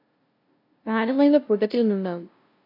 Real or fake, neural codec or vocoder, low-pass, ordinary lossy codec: fake; codec, 16 kHz, 0.5 kbps, FunCodec, trained on LibriTTS, 25 frames a second; 5.4 kHz; AAC, 32 kbps